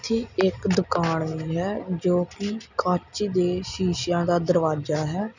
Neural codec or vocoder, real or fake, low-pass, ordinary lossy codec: none; real; 7.2 kHz; none